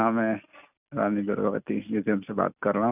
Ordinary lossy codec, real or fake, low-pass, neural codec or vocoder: none; real; 3.6 kHz; none